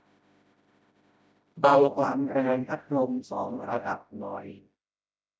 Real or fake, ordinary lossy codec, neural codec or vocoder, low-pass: fake; none; codec, 16 kHz, 0.5 kbps, FreqCodec, smaller model; none